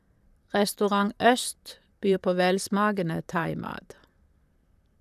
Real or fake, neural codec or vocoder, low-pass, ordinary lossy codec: fake; vocoder, 44.1 kHz, 128 mel bands, Pupu-Vocoder; 14.4 kHz; none